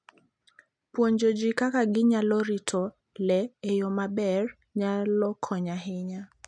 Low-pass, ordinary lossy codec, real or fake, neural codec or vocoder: 9.9 kHz; none; real; none